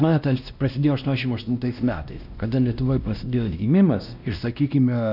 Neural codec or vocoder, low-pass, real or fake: codec, 16 kHz, 1 kbps, X-Codec, WavLM features, trained on Multilingual LibriSpeech; 5.4 kHz; fake